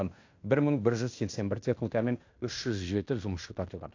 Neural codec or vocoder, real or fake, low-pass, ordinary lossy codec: codec, 16 kHz in and 24 kHz out, 0.9 kbps, LongCat-Audio-Codec, fine tuned four codebook decoder; fake; 7.2 kHz; AAC, 48 kbps